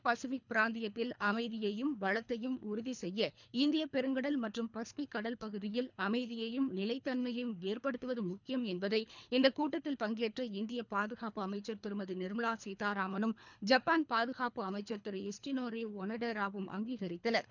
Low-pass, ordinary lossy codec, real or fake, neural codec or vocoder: 7.2 kHz; none; fake; codec, 24 kHz, 3 kbps, HILCodec